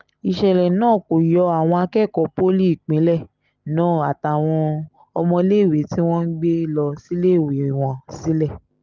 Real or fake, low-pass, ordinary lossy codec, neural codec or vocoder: real; 7.2 kHz; Opus, 24 kbps; none